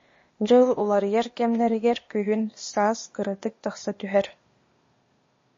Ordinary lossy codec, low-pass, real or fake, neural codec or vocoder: MP3, 32 kbps; 7.2 kHz; fake; codec, 16 kHz, 0.8 kbps, ZipCodec